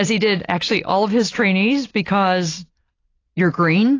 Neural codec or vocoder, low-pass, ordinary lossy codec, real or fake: none; 7.2 kHz; AAC, 32 kbps; real